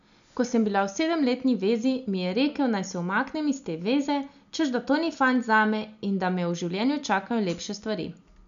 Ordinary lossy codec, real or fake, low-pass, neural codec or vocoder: none; real; 7.2 kHz; none